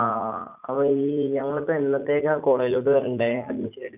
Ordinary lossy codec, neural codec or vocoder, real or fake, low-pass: none; vocoder, 44.1 kHz, 80 mel bands, Vocos; fake; 3.6 kHz